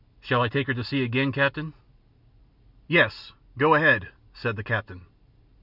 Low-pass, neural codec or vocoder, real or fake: 5.4 kHz; none; real